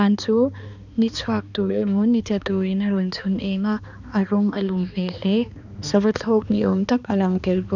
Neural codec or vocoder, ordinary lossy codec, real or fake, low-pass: codec, 16 kHz, 2 kbps, X-Codec, HuBERT features, trained on balanced general audio; none; fake; 7.2 kHz